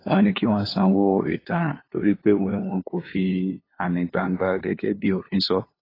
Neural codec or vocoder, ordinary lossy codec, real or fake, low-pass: codec, 16 kHz, 2 kbps, FunCodec, trained on LibriTTS, 25 frames a second; AAC, 24 kbps; fake; 5.4 kHz